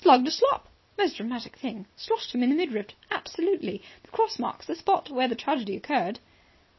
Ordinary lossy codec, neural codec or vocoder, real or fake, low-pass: MP3, 24 kbps; none; real; 7.2 kHz